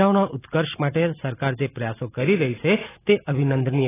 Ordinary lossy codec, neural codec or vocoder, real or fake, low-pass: AAC, 16 kbps; none; real; 3.6 kHz